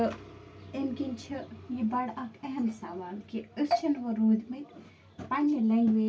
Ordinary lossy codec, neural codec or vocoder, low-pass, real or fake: none; none; none; real